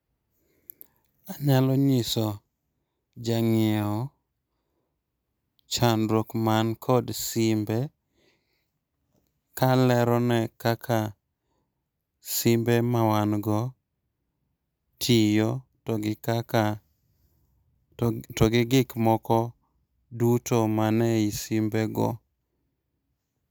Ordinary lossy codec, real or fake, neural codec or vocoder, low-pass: none; real; none; none